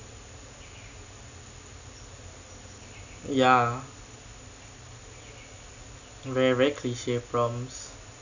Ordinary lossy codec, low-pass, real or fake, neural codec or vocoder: none; 7.2 kHz; real; none